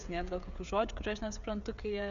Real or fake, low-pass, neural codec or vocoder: fake; 7.2 kHz; codec, 16 kHz, 16 kbps, FreqCodec, smaller model